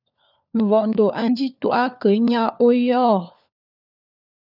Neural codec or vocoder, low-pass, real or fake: codec, 16 kHz, 4 kbps, FunCodec, trained on LibriTTS, 50 frames a second; 5.4 kHz; fake